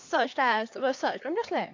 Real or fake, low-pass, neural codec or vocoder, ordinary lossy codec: fake; 7.2 kHz; codec, 16 kHz, 2 kbps, X-Codec, HuBERT features, trained on LibriSpeech; AAC, 48 kbps